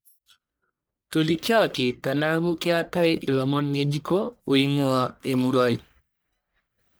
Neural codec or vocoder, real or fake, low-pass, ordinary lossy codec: codec, 44.1 kHz, 1.7 kbps, Pupu-Codec; fake; none; none